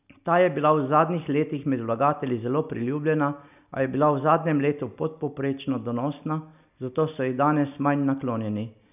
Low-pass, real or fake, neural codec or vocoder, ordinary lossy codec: 3.6 kHz; real; none; none